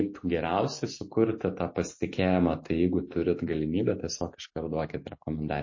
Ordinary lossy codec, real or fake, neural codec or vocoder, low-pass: MP3, 32 kbps; real; none; 7.2 kHz